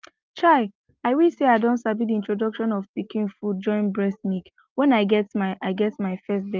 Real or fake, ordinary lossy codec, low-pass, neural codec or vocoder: real; Opus, 32 kbps; 7.2 kHz; none